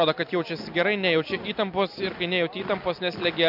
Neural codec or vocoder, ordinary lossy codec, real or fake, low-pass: none; MP3, 48 kbps; real; 5.4 kHz